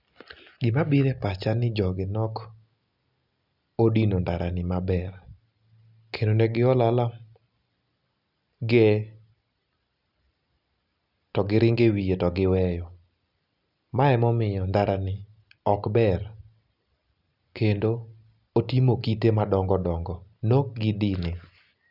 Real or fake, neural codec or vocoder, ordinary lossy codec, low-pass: real; none; none; 5.4 kHz